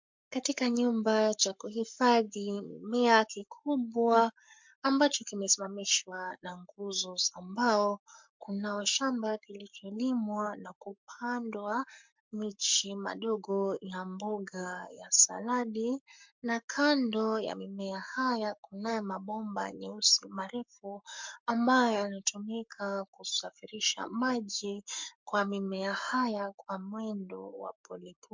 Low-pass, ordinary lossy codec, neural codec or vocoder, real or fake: 7.2 kHz; MP3, 64 kbps; codec, 44.1 kHz, 7.8 kbps, Pupu-Codec; fake